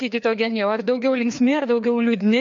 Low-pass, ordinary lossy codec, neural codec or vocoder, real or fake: 7.2 kHz; MP3, 48 kbps; codec, 16 kHz, 2 kbps, FreqCodec, larger model; fake